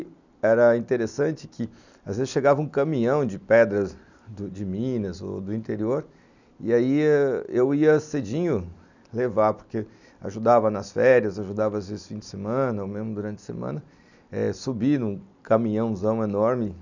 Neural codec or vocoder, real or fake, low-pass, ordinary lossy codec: none; real; 7.2 kHz; none